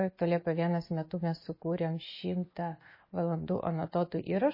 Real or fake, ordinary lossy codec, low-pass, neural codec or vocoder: real; MP3, 24 kbps; 5.4 kHz; none